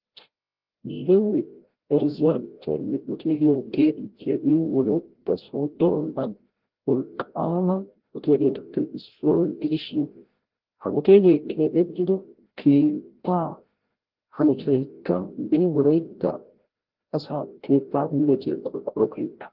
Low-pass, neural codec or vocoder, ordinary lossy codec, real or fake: 5.4 kHz; codec, 16 kHz, 0.5 kbps, FreqCodec, larger model; Opus, 16 kbps; fake